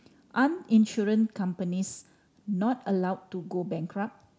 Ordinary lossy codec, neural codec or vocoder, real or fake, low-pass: none; none; real; none